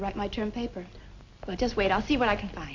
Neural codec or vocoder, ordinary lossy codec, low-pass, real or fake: none; MP3, 64 kbps; 7.2 kHz; real